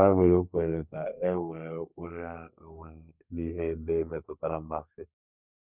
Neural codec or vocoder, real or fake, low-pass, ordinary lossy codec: codec, 32 kHz, 1.9 kbps, SNAC; fake; 3.6 kHz; none